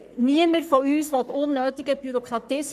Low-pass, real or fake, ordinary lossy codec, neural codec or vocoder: 14.4 kHz; fake; none; codec, 44.1 kHz, 3.4 kbps, Pupu-Codec